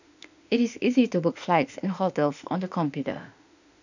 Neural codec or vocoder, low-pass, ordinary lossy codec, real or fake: autoencoder, 48 kHz, 32 numbers a frame, DAC-VAE, trained on Japanese speech; 7.2 kHz; none; fake